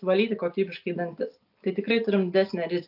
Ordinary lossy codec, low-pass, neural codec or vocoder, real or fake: AAC, 48 kbps; 5.4 kHz; none; real